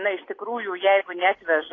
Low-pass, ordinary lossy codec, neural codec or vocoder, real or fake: 7.2 kHz; AAC, 32 kbps; none; real